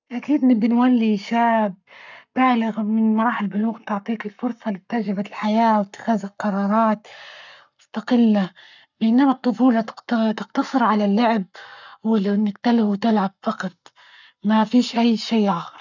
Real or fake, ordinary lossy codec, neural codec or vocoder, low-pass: fake; none; codec, 44.1 kHz, 7.8 kbps, Pupu-Codec; 7.2 kHz